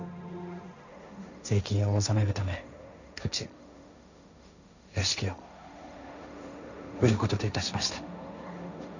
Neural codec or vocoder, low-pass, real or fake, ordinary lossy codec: codec, 16 kHz, 1.1 kbps, Voila-Tokenizer; 7.2 kHz; fake; none